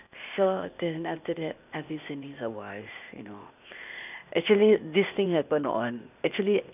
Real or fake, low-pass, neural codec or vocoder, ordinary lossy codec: fake; 3.6 kHz; codec, 16 kHz, 0.8 kbps, ZipCodec; none